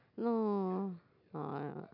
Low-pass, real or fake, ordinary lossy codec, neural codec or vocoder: 5.4 kHz; real; none; none